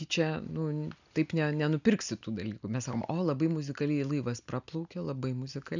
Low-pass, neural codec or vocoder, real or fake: 7.2 kHz; none; real